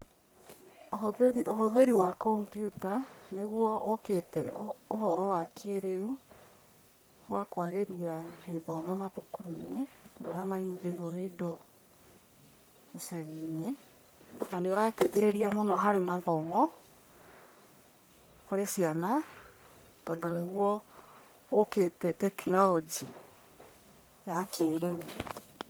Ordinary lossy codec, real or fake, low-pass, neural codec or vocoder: none; fake; none; codec, 44.1 kHz, 1.7 kbps, Pupu-Codec